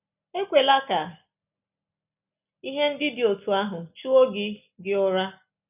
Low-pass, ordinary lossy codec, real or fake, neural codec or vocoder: 3.6 kHz; none; real; none